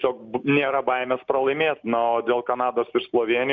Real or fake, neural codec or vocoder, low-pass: real; none; 7.2 kHz